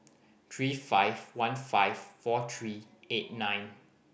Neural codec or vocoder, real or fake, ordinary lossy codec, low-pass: none; real; none; none